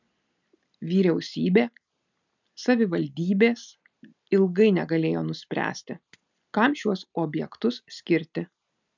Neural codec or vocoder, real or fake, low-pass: none; real; 7.2 kHz